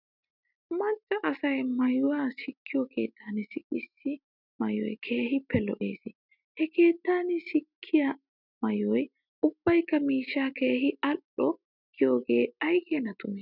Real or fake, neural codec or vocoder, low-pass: real; none; 5.4 kHz